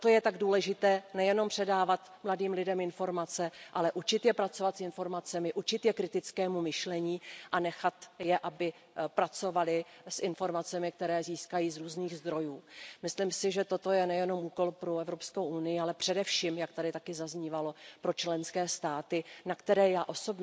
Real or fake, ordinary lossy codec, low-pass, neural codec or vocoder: real; none; none; none